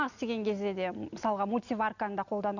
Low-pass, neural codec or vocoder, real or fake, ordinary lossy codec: 7.2 kHz; none; real; none